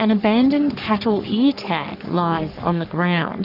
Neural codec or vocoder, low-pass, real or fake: codec, 44.1 kHz, 3.4 kbps, Pupu-Codec; 5.4 kHz; fake